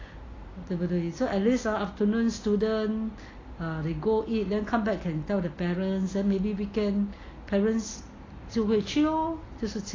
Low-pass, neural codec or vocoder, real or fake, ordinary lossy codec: 7.2 kHz; none; real; AAC, 32 kbps